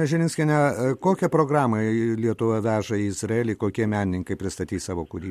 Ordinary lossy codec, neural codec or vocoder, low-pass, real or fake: MP3, 64 kbps; none; 14.4 kHz; real